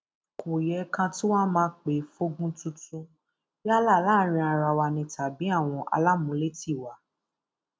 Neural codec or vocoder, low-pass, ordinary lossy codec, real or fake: none; none; none; real